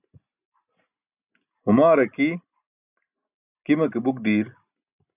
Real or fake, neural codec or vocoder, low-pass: real; none; 3.6 kHz